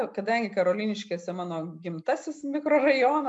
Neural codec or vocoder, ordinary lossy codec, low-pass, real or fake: none; MP3, 96 kbps; 10.8 kHz; real